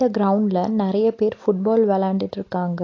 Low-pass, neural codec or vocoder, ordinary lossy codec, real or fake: 7.2 kHz; none; AAC, 32 kbps; real